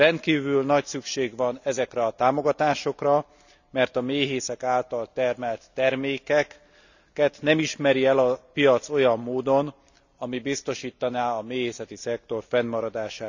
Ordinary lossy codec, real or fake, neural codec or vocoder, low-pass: none; real; none; 7.2 kHz